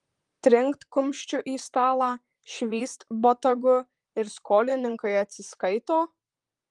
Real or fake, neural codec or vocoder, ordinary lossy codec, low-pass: fake; vocoder, 44.1 kHz, 128 mel bands, Pupu-Vocoder; Opus, 32 kbps; 10.8 kHz